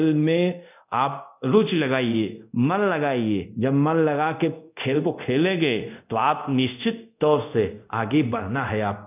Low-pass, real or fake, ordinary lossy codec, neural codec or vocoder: 3.6 kHz; fake; none; codec, 24 kHz, 0.5 kbps, DualCodec